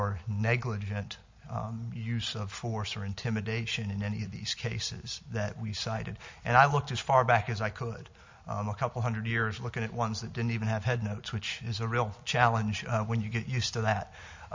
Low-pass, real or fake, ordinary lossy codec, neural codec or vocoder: 7.2 kHz; real; MP3, 48 kbps; none